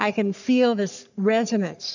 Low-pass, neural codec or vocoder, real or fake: 7.2 kHz; codec, 44.1 kHz, 3.4 kbps, Pupu-Codec; fake